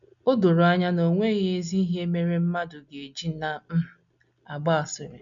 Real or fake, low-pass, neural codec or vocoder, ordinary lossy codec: real; 7.2 kHz; none; none